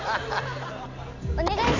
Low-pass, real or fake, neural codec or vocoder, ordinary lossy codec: 7.2 kHz; real; none; none